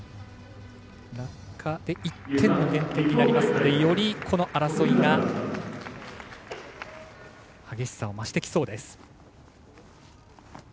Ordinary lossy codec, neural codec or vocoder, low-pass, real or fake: none; none; none; real